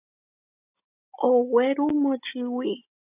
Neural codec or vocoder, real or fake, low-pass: none; real; 3.6 kHz